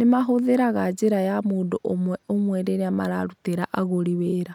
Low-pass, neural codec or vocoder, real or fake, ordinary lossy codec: 19.8 kHz; none; real; none